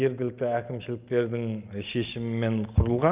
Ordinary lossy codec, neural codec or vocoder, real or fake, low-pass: Opus, 32 kbps; none; real; 3.6 kHz